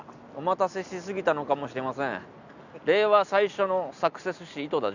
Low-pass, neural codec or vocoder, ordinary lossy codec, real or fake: 7.2 kHz; none; none; real